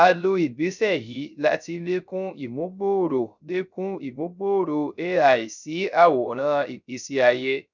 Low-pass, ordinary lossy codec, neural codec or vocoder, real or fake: 7.2 kHz; none; codec, 16 kHz, 0.3 kbps, FocalCodec; fake